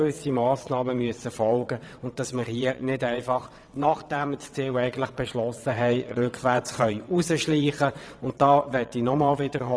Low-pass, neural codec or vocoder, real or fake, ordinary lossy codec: none; vocoder, 22.05 kHz, 80 mel bands, WaveNeXt; fake; none